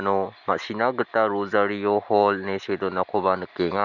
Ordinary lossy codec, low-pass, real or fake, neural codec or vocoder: none; 7.2 kHz; real; none